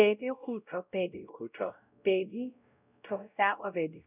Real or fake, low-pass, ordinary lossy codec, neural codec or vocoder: fake; 3.6 kHz; none; codec, 16 kHz, 0.5 kbps, X-Codec, WavLM features, trained on Multilingual LibriSpeech